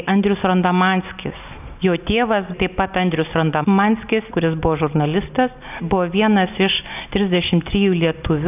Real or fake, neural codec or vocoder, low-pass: real; none; 3.6 kHz